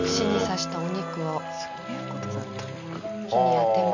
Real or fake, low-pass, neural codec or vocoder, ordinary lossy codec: real; 7.2 kHz; none; none